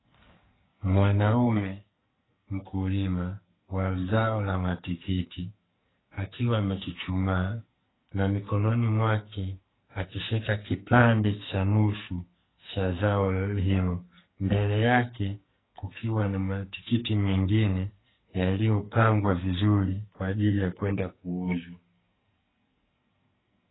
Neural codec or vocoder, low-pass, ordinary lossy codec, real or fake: codec, 44.1 kHz, 2.6 kbps, SNAC; 7.2 kHz; AAC, 16 kbps; fake